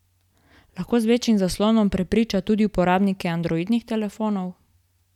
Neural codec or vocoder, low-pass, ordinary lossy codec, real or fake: none; 19.8 kHz; none; real